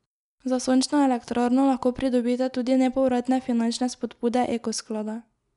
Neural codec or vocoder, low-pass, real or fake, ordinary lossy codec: none; 10.8 kHz; real; none